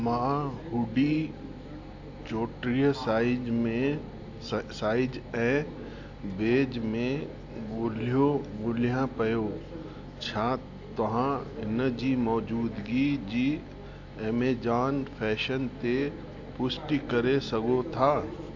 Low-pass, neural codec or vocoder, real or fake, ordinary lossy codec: 7.2 kHz; none; real; AAC, 48 kbps